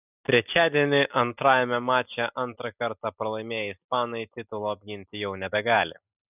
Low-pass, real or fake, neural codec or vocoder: 3.6 kHz; real; none